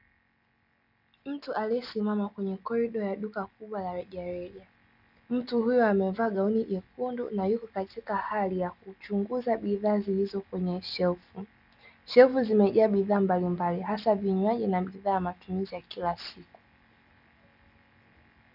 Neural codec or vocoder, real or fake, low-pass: none; real; 5.4 kHz